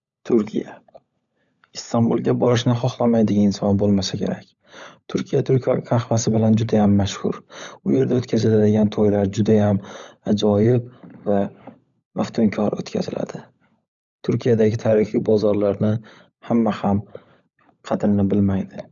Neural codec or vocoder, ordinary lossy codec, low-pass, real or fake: codec, 16 kHz, 16 kbps, FunCodec, trained on LibriTTS, 50 frames a second; none; 7.2 kHz; fake